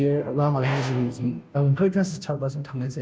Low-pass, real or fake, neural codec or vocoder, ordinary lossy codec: none; fake; codec, 16 kHz, 0.5 kbps, FunCodec, trained on Chinese and English, 25 frames a second; none